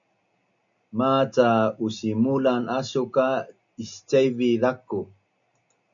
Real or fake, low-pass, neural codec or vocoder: real; 7.2 kHz; none